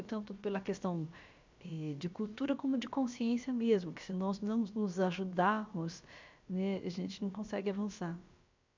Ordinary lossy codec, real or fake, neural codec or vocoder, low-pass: MP3, 64 kbps; fake; codec, 16 kHz, about 1 kbps, DyCAST, with the encoder's durations; 7.2 kHz